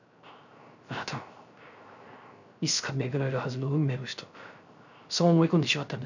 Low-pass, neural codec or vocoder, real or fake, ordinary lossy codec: 7.2 kHz; codec, 16 kHz, 0.3 kbps, FocalCodec; fake; none